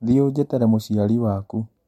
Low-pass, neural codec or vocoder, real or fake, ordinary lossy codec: 19.8 kHz; none; real; MP3, 64 kbps